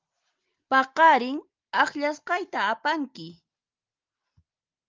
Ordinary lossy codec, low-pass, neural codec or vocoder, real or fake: Opus, 24 kbps; 7.2 kHz; none; real